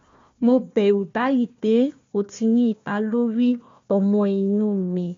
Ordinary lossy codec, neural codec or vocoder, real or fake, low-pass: MP3, 48 kbps; codec, 16 kHz, 1 kbps, FunCodec, trained on Chinese and English, 50 frames a second; fake; 7.2 kHz